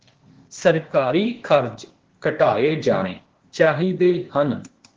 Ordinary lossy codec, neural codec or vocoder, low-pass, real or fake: Opus, 16 kbps; codec, 16 kHz, 0.8 kbps, ZipCodec; 7.2 kHz; fake